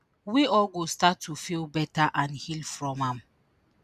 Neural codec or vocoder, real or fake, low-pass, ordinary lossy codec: none; real; 14.4 kHz; none